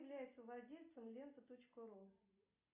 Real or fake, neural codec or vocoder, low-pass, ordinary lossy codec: real; none; 3.6 kHz; AAC, 32 kbps